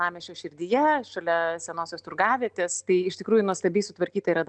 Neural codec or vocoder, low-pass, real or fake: none; 9.9 kHz; real